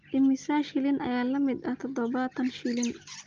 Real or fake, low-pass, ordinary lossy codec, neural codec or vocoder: real; 7.2 kHz; Opus, 24 kbps; none